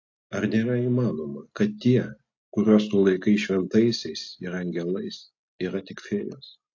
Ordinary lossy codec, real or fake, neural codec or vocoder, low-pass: AAC, 48 kbps; real; none; 7.2 kHz